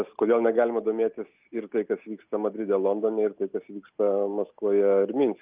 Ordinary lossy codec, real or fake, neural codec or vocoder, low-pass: Opus, 24 kbps; real; none; 3.6 kHz